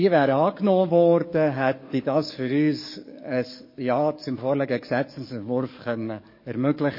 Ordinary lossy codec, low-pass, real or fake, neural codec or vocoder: MP3, 24 kbps; 5.4 kHz; fake; codec, 44.1 kHz, 7.8 kbps, DAC